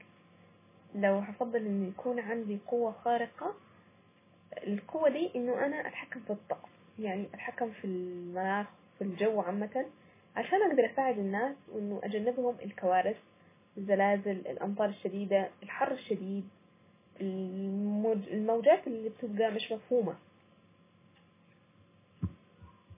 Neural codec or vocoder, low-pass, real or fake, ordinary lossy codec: none; 3.6 kHz; real; MP3, 16 kbps